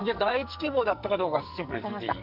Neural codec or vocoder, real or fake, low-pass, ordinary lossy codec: codec, 44.1 kHz, 2.6 kbps, SNAC; fake; 5.4 kHz; none